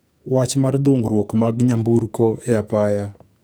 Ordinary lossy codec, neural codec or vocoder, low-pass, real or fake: none; codec, 44.1 kHz, 2.6 kbps, SNAC; none; fake